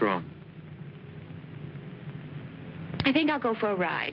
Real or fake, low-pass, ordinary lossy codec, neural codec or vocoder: real; 5.4 kHz; Opus, 32 kbps; none